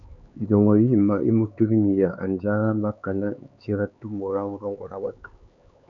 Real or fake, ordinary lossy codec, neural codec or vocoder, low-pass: fake; MP3, 96 kbps; codec, 16 kHz, 4 kbps, X-Codec, HuBERT features, trained on LibriSpeech; 7.2 kHz